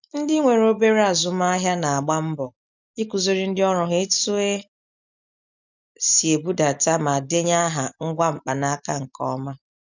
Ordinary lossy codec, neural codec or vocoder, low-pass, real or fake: none; none; 7.2 kHz; real